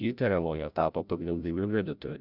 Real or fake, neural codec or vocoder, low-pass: fake; codec, 16 kHz, 0.5 kbps, FreqCodec, larger model; 5.4 kHz